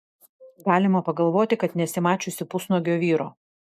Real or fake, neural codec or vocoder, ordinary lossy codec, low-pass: real; none; MP3, 96 kbps; 14.4 kHz